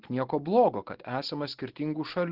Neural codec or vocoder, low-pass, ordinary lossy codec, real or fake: none; 5.4 kHz; Opus, 16 kbps; real